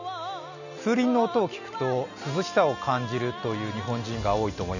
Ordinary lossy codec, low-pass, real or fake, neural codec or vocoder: none; 7.2 kHz; real; none